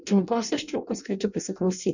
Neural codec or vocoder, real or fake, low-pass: codec, 16 kHz in and 24 kHz out, 0.6 kbps, FireRedTTS-2 codec; fake; 7.2 kHz